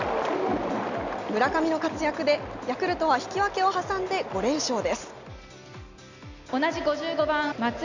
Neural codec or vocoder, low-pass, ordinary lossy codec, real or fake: none; 7.2 kHz; Opus, 64 kbps; real